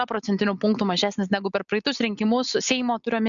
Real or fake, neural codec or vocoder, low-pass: real; none; 7.2 kHz